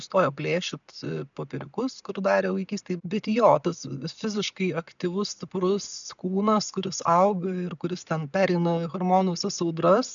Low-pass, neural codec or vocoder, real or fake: 7.2 kHz; none; real